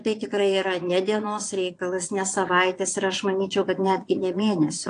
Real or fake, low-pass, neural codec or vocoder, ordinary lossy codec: fake; 9.9 kHz; vocoder, 22.05 kHz, 80 mel bands, Vocos; AAC, 48 kbps